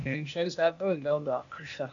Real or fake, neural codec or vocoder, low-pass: fake; codec, 16 kHz, 0.8 kbps, ZipCodec; 7.2 kHz